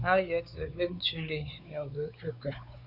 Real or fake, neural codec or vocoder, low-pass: fake; codec, 16 kHz, 4 kbps, X-Codec, WavLM features, trained on Multilingual LibriSpeech; 5.4 kHz